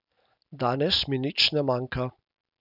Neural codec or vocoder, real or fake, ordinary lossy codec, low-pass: none; real; none; 5.4 kHz